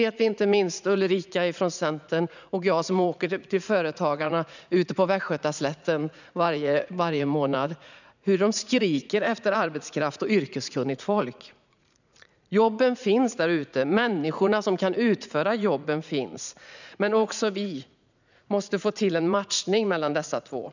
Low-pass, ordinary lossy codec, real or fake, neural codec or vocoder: 7.2 kHz; none; fake; vocoder, 44.1 kHz, 80 mel bands, Vocos